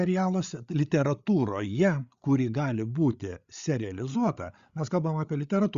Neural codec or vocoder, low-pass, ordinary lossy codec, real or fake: codec, 16 kHz, 8 kbps, FreqCodec, larger model; 7.2 kHz; Opus, 64 kbps; fake